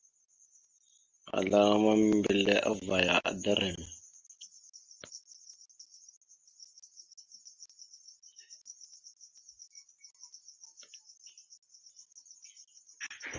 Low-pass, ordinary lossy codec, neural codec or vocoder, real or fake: 7.2 kHz; Opus, 32 kbps; none; real